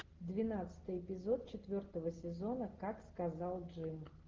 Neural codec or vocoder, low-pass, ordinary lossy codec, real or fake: none; 7.2 kHz; Opus, 16 kbps; real